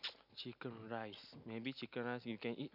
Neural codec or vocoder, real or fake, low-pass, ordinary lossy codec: none; real; 5.4 kHz; none